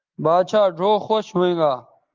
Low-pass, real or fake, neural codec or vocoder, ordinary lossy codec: 7.2 kHz; real; none; Opus, 32 kbps